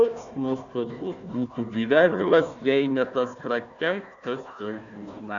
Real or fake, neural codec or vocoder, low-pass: fake; codec, 16 kHz, 1 kbps, FunCodec, trained on Chinese and English, 50 frames a second; 7.2 kHz